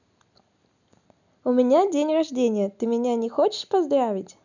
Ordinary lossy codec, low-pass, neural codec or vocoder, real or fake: none; 7.2 kHz; none; real